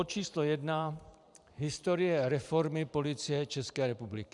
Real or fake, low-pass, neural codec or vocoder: fake; 10.8 kHz; vocoder, 44.1 kHz, 128 mel bands every 512 samples, BigVGAN v2